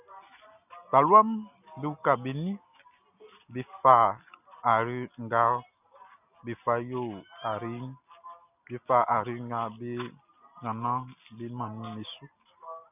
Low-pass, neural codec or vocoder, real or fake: 3.6 kHz; none; real